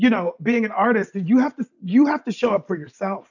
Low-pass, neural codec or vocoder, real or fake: 7.2 kHz; none; real